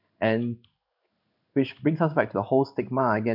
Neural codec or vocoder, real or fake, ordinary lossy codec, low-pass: codec, 16 kHz in and 24 kHz out, 1 kbps, XY-Tokenizer; fake; none; 5.4 kHz